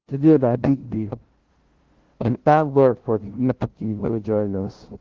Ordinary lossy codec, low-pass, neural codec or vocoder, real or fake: Opus, 16 kbps; 7.2 kHz; codec, 16 kHz, 0.5 kbps, FunCodec, trained on LibriTTS, 25 frames a second; fake